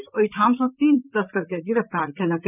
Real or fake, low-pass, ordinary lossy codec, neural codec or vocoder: fake; 3.6 kHz; none; vocoder, 44.1 kHz, 128 mel bands, Pupu-Vocoder